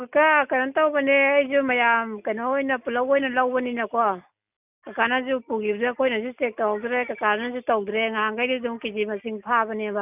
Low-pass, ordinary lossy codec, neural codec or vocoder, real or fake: 3.6 kHz; none; none; real